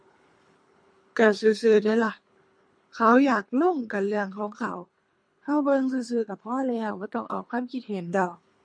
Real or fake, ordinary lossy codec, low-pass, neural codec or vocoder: fake; MP3, 48 kbps; 9.9 kHz; codec, 24 kHz, 3 kbps, HILCodec